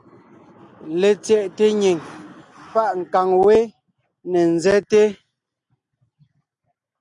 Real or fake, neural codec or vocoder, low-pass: real; none; 10.8 kHz